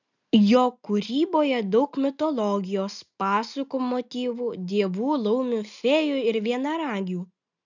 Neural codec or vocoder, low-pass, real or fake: none; 7.2 kHz; real